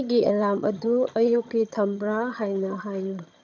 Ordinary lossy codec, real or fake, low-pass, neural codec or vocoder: none; fake; 7.2 kHz; vocoder, 22.05 kHz, 80 mel bands, HiFi-GAN